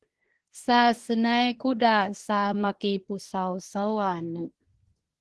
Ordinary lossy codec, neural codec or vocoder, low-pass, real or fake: Opus, 16 kbps; codec, 24 kHz, 1 kbps, SNAC; 10.8 kHz; fake